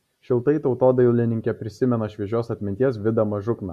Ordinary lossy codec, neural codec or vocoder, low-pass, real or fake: Opus, 64 kbps; none; 14.4 kHz; real